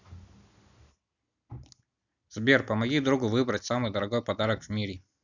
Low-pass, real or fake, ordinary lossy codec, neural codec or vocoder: 7.2 kHz; real; none; none